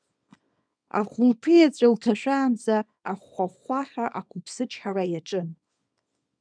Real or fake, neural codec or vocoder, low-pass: fake; codec, 24 kHz, 0.9 kbps, WavTokenizer, small release; 9.9 kHz